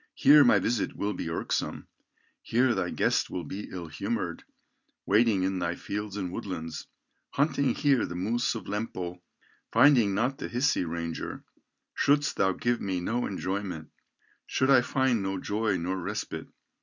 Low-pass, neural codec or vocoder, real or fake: 7.2 kHz; none; real